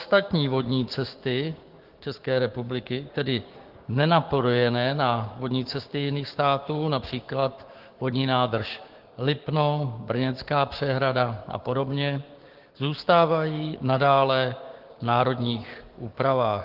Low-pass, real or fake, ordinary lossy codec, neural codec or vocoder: 5.4 kHz; fake; Opus, 16 kbps; codec, 16 kHz, 6 kbps, DAC